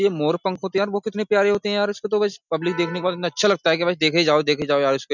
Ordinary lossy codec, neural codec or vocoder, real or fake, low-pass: none; none; real; 7.2 kHz